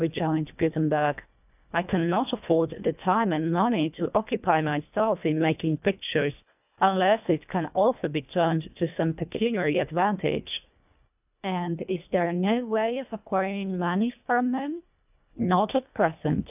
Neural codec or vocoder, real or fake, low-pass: codec, 24 kHz, 1.5 kbps, HILCodec; fake; 3.6 kHz